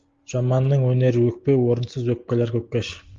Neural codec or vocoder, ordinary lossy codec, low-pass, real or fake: none; Opus, 32 kbps; 7.2 kHz; real